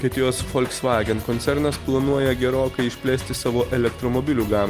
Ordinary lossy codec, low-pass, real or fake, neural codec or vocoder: Opus, 32 kbps; 14.4 kHz; real; none